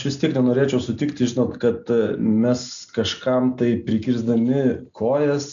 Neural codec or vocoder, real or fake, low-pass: none; real; 7.2 kHz